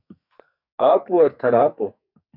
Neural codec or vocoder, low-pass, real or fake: codec, 44.1 kHz, 2.6 kbps, SNAC; 5.4 kHz; fake